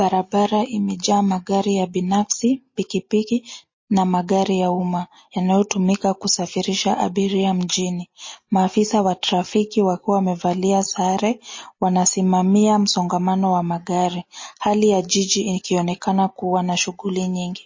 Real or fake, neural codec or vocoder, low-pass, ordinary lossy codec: real; none; 7.2 kHz; MP3, 32 kbps